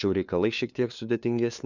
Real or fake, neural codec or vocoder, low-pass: fake; codec, 16 kHz, 2 kbps, FunCodec, trained on LibriTTS, 25 frames a second; 7.2 kHz